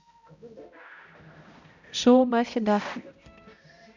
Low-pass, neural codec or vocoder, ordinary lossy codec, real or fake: 7.2 kHz; codec, 16 kHz, 0.5 kbps, X-Codec, HuBERT features, trained on balanced general audio; none; fake